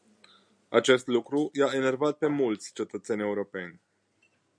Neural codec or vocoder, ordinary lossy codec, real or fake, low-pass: none; MP3, 96 kbps; real; 9.9 kHz